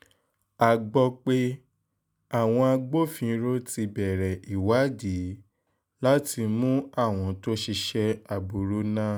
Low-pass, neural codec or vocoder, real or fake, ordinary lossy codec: none; none; real; none